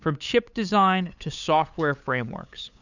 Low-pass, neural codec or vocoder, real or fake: 7.2 kHz; codec, 16 kHz, 8 kbps, FunCodec, trained on LibriTTS, 25 frames a second; fake